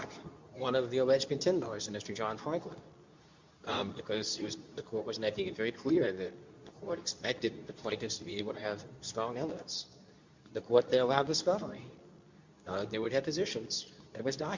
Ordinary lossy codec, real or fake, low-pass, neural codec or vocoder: MP3, 64 kbps; fake; 7.2 kHz; codec, 24 kHz, 0.9 kbps, WavTokenizer, medium speech release version 2